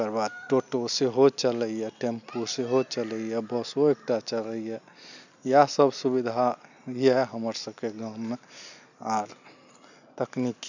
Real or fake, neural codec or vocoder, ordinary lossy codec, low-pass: real; none; none; 7.2 kHz